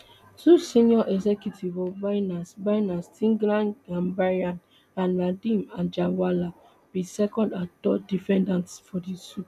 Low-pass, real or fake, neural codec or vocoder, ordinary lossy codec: 14.4 kHz; real; none; none